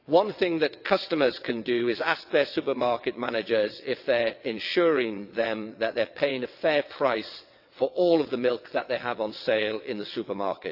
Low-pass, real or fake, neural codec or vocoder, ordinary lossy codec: 5.4 kHz; fake; vocoder, 22.05 kHz, 80 mel bands, WaveNeXt; AAC, 48 kbps